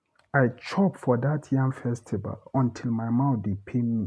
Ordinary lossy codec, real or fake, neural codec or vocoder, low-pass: none; real; none; none